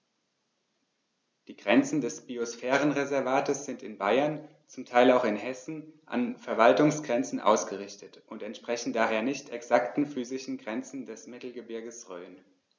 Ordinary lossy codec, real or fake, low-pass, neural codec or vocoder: none; real; none; none